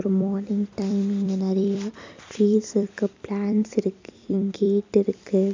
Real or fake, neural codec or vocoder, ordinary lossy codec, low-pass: fake; vocoder, 44.1 kHz, 128 mel bands every 256 samples, BigVGAN v2; MP3, 64 kbps; 7.2 kHz